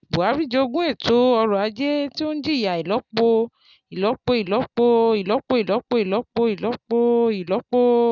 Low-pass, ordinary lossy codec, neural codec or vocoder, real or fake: 7.2 kHz; none; none; real